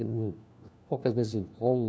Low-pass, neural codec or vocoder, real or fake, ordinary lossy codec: none; codec, 16 kHz, 1 kbps, FunCodec, trained on LibriTTS, 50 frames a second; fake; none